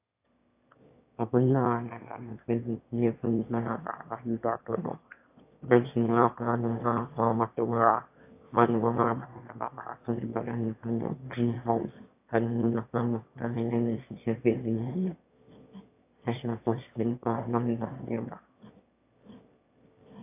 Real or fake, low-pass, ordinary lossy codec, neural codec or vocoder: fake; 3.6 kHz; AAC, 32 kbps; autoencoder, 22.05 kHz, a latent of 192 numbers a frame, VITS, trained on one speaker